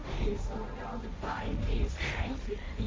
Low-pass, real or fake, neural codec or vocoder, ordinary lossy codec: none; fake; codec, 16 kHz, 1.1 kbps, Voila-Tokenizer; none